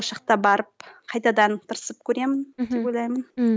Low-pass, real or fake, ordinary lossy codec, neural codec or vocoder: none; real; none; none